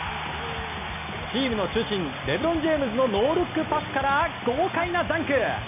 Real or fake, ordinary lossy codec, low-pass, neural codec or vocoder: real; none; 3.6 kHz; none